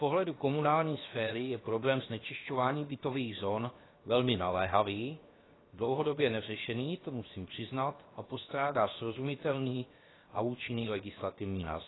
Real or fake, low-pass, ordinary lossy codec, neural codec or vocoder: fake; 7.2 kHz; AAC, 16 kbps; codec, 16 kHz, 0.7 kbps, FocalCodec